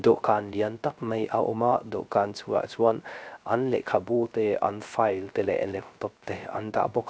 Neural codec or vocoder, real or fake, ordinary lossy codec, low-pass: codec, 16 kHz, 0.3 kbps, FocalCodec; fake; none; none